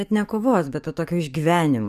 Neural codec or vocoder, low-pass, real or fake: codec, 44.1 kHz, 7.8 kbps, DAC; 14.4 kHz; fake